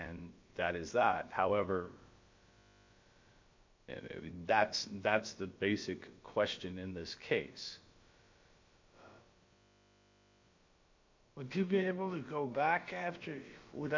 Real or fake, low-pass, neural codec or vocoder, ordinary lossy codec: fake; 7.2 kHz; codec, 16 kHz, about 1 kbps, DyCAST, with the encoder's durations; MP3, 48 kbps